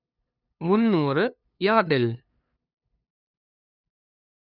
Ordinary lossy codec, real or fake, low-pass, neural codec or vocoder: none; fake; 5.4 kHz; codec, 16 kHz, 2 kbps, FunCodec, trained on LibriTTS, 25 frames a second